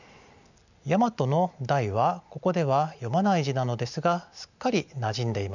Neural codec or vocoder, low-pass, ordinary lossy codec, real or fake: none; 7.2 kHz; none; real